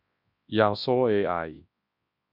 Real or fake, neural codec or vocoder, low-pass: fake; codec, 24 kHz, 0.9 kbps, WavTokenizer, large speech release; 5.4 kHz